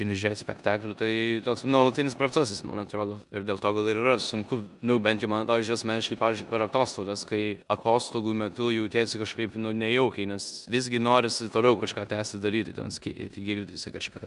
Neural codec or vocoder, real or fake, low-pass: codec, 16 kHz in and 24 kHz out, 0.9 kbps, LongCat-Audio-Codec, four codebook decoder; fake; 10.8 kHz